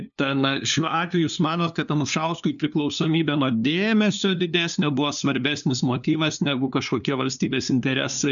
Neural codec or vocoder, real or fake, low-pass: codec, 16 kHz, 2 kbps, FunCodec, trained on LibriTTS, 25 frames a second; fake; 7.2 kHz